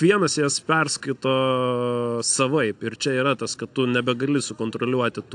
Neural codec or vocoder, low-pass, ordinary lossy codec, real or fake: none; 9.9 kHz; AAC, 64 kbps; real